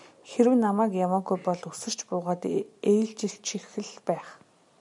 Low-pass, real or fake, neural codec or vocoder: 10.8 kHz; real; none